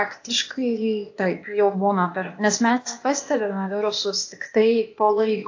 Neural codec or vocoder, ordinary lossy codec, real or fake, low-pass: codec, 16 kHz, 0.8 kbps, ZipCodec; AAC, 48 kbps; fake; 7.2 kHz